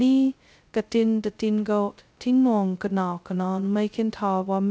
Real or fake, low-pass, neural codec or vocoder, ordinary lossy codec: fake; none; codec, 16 kHz, 0.2 kbps, FocalCodec; none